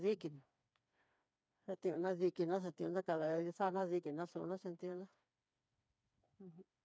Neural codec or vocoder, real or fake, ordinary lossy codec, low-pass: codec, 16 kHz, 4 kbps, FreqCodec, smaller model; fake; none; none